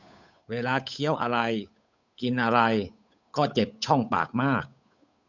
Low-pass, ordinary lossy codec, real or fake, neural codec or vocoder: 7.2 kHz; none; fake; codec, 16 kHz, 8 kbps, FunCodec, trained on Chinese and English, 25 frames a second